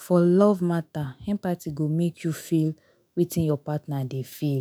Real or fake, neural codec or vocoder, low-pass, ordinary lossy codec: fake; autoencoder, 48 kHz, 128 numbers a frame, DAC-VAE, trained on Japanese speech; none; none